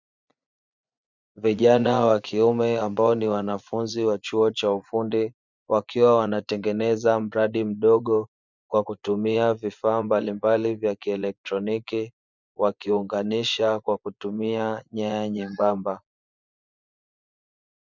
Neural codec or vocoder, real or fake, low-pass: vocoder, 24 kHz, 100 mel bands, Vocos; fake; 7.2 kHz